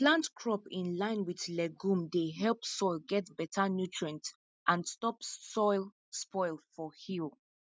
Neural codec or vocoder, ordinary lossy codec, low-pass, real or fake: none; none; none; real